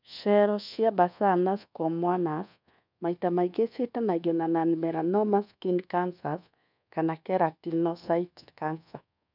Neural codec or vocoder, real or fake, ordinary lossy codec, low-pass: codec, 24 kHz, 1.2 kbps, DualCodec; fake; none; 5.4 kHz